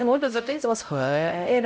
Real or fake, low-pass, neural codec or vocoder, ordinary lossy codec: fake; none; codec, 16 kHz, 0.5 kbps, X-Codec, HuBERT features, trained on LibriSpeech; none